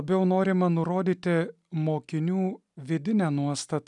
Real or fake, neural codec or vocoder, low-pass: real; none; 10.8 kHz